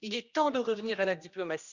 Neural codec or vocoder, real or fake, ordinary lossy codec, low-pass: codec, 16 kHz, 1 kbps, X-Codec, HuBERT features, trained on general audio; fake; Opus, 64 kbps; 7.2 kHz